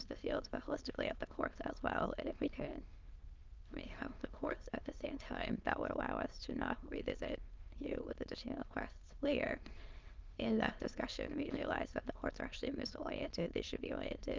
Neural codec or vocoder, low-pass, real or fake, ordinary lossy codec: autoencoder, 22.05 kHz, a latent of 192 numbers a frame, VITS, trained on many speakers; 7.2 kHz; fake; Opus, 32 kbps